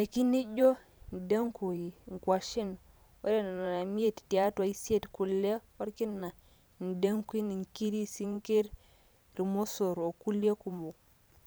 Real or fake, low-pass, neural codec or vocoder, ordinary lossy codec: fake; none; vocoder, 44.1 kHz, 128 mel bands every 512 samples, BigVGAN v2; none